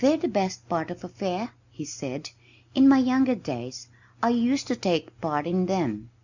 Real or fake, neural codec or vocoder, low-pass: real; none; 7.2 kHz